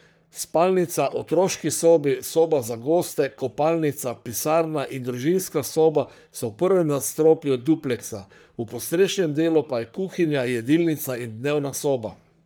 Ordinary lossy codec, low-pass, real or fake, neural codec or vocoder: none; none; fake; codec, 44.1 kHz, 3.4 kbps, Pupu-Codec